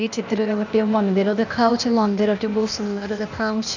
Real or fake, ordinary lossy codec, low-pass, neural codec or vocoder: fake; none; 7.2 kHz; codec, 16 kHz, 0.8 kbps, ZipCodec